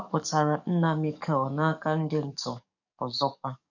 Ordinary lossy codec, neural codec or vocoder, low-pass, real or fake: none; codec, 44.1 kHz, 7.8 kbps, DAC; 7.2 kHz; fake